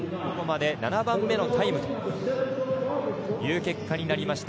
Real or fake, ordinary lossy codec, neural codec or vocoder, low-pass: real; none; none; none